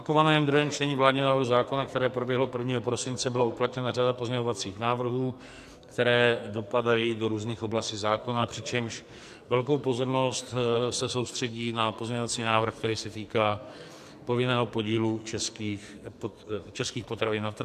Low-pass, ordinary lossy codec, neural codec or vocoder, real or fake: 14.4 kHz; AAC, 96 kbps; codec, 44.1 kHz, 2.6 kbps, SNAC; fake